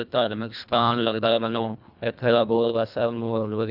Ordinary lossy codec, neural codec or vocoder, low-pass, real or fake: none; codec, 24 kHz, 1.5 kbps, HILCodec; 5.4 kHz; fake